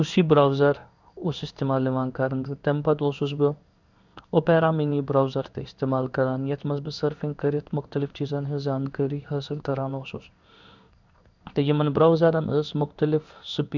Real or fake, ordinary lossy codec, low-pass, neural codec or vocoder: fake; none; 7.2 kHz; codec, 16 kHz in and 24 kHz out, 1 kbps, XY-Tokenizer